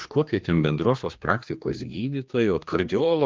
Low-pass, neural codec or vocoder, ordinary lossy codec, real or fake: 7.2 kHz; codec, 16 kHz, 2 kbps, X-Codec, HuBERT features, trained on general audio; Opus, 32 kbps; fake